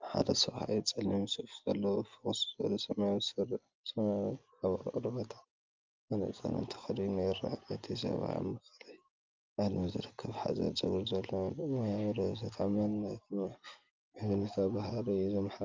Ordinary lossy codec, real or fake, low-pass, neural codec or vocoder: Opus, 24 kbps; real; 7.2 kHz; none